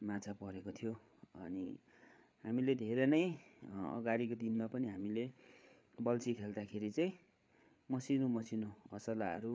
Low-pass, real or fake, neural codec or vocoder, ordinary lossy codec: none; fake; codec, 16 kHz, 8 kbps, FreqCodec, larger model; none